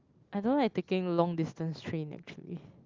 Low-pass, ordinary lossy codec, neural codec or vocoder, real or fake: 7.2 kHz; Opus, 32 kbps; none; real